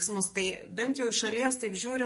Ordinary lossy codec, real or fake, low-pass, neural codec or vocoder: MP3, 48 kbps; fake; 14.4 kHz; codec, 44.1 kHz, 2.6 kbps, SNAC